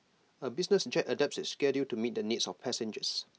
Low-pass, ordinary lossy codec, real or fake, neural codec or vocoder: none; none; real; none